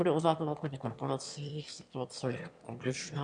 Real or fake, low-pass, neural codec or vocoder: fake; 9.9 kHz; autoencoder, 22.05 kHz, a latent of 192 numbers a frame, VITS, trained on one speaker